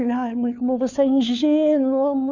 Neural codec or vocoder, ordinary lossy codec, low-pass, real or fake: codec, 16 kHz, 4 kbps, FunCodec, trained on LibriTTS, 50 frames a second; none; 7.2 kHz; fake